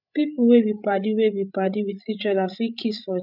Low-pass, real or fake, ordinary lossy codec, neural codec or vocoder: 5.4 kHz; fake; none; codec, 16 kHz, 8 kbps, FreqCodec, larger model